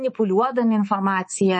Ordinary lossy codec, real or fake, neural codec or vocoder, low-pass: MP3, 32 kbps; fake; codec, 24 kHz, 3.1 kbps, DualCodec; 10.8 kHz